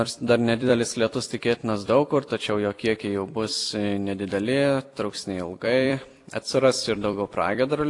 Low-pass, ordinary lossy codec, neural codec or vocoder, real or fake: 10.8 kHz; AAC, 48 kbps; vocoder, 44.1 kHz, 128 mel bands every 256 samples, BigVGAN v2; fake